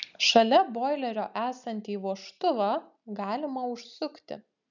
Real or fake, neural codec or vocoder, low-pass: real; none; 7.2 kHz